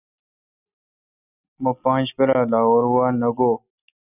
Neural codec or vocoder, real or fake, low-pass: none; real; 3.6 kHz